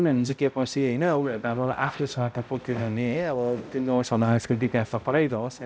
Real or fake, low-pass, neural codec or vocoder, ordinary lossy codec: fake; none; codec, 16 kHz, 0.5 kbps, X-Codec, HuBERT features, trained on balanced general audio; none